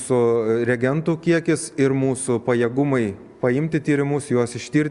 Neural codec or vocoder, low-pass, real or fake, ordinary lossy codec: none; 10.8 kHz; real; Opus, 64 kbps